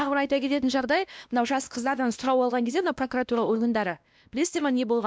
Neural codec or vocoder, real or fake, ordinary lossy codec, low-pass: codec, 16 kHz, 1 kbps, X-Codec, WavLM features, trained on Multilingual LibriSpeech; fake; none; none